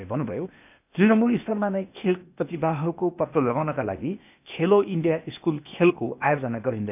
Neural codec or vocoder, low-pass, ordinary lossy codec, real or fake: codec, 16 kHz, 0.8 kbps, ZipCodec; 3.6 kHz; none; fake